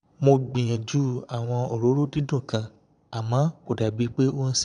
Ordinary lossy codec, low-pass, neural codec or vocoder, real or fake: none; 9.9 kHz; vocoder, 22.05 kHz, 80 mel bands, Vocos; fake